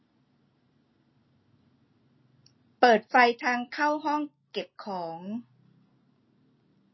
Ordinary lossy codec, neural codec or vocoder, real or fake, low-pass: MP3, 24 kbps; none; real; 7.2 kHz